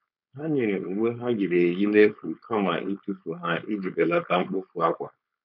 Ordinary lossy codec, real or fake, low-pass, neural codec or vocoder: none; fake; 5.4 kHz; codec, 16 kHz, 4.8 kbps, FACodec